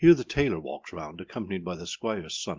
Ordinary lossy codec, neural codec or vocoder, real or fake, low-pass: Opus, 24 kbps; none; real; 7.2 kHz